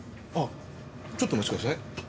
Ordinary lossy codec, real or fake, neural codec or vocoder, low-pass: none; real; none; none